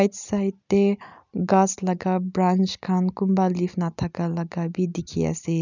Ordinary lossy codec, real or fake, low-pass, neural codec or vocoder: none; real; 7.2 kHz; none